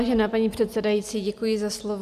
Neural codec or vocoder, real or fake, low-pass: none; real; 14.4 kHz